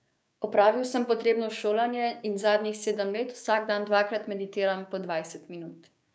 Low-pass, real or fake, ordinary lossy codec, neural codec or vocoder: none; fake; none; codec, 16 kHz, 6 kbps, DAC